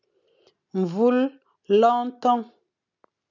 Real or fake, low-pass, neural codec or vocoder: real; 7.2 kHz; none